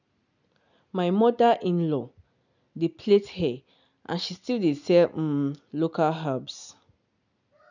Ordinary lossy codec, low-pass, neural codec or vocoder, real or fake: none; 7.2 kHz; none; real